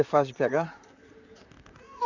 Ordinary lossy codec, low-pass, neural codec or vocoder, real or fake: none; 7.2 kHz; vocoder, 44.1 kHz, 128 mel bands, Pupu-Vocoder; fake